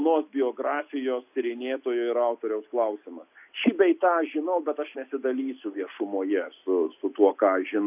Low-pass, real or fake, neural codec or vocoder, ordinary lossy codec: 3.6 kHz; real; none; AAC, 32 kbps